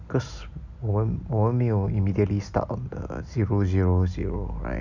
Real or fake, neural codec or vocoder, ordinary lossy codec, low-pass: real; none; none; 7.2 kHz